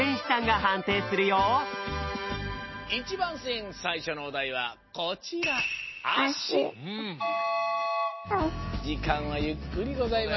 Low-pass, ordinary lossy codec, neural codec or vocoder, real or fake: 7.2 kHz; MP3, 24 kbps; none; real